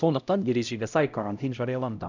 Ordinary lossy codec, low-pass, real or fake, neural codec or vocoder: none; 7.2 kHz; fake; codec, 16 kHz, 0.5 kbps, X-Codec, HuBERT features, trained on LibriSpeech